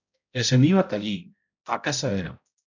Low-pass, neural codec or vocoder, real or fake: 7.2 kHz; codec, 16 kHz, 0.5 kbps, X-Codec, HuBERT features, trained on balanced general audio; fake